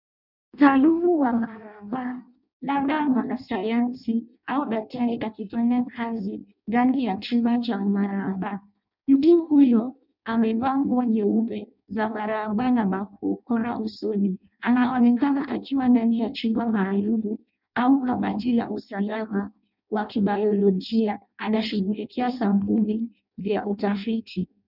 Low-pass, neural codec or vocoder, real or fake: 5.4 kHz; codec, 16 kHz in and 24 kHz out, 0.6 kbps, FireRedTTS-2 codec; fake